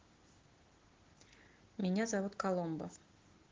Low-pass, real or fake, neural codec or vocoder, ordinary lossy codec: 7.2 kHz; real; none; Opus, 16 kbps